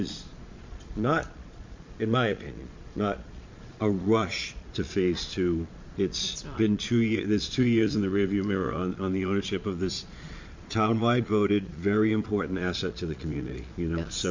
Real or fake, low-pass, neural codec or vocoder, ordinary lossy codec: fake; 7.2 kHz; vocoder, 22.05 kHz, 80 mel bands, Vocos; MP3, 48 kbps